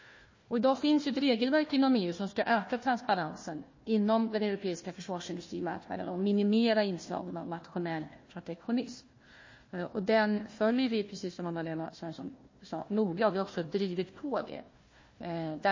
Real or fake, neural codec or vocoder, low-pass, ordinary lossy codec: fake; codec, 16 kHz, 1 kbps, FunCodec, trained on Chinese and English, 50 frames a second; 7.2 kHz; MP3, 32 kbps